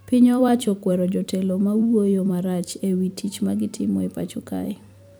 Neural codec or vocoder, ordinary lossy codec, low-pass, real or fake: vocoder, 44.1 kHz, 128 mel bands every 256 samples, BigVGAN v2; none; none; fake